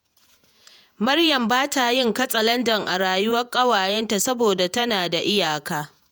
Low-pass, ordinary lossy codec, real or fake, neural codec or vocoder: none; none; fake; vocoder, 48 kHz, 128 mel bands, Vocos